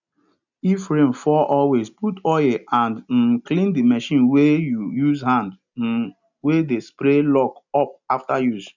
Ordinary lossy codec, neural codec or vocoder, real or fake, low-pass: none; none; real; 7.2 kHz